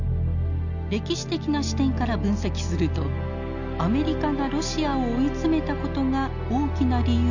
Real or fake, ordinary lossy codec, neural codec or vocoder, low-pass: real; none; none; 7.2 kHz